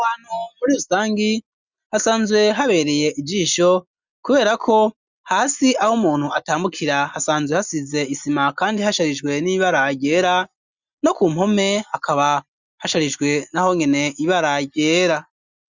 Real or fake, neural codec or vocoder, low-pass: real; none; 7.2 kHz